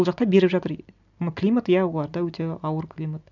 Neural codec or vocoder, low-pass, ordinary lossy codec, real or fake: none; 7.2 kHz; none; real